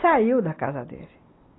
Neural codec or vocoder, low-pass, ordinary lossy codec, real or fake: none; 7.2 kHz; AAC, 16 kbps; real